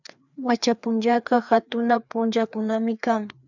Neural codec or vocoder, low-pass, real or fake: codec, 16 kHz, 2 kbps, FreqCodec, larger model; 7.2 kHz; fake